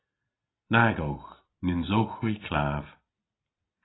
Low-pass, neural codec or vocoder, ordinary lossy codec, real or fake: 7.2 kHz; none; AAC, 16 kbps; real